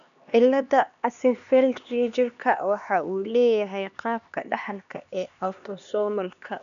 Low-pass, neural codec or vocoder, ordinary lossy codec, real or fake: 7.2 kHz; codec, 16 kHz, 2 kbps, X-Codec, HuBERT features, trained on LibriSpeech; none; fake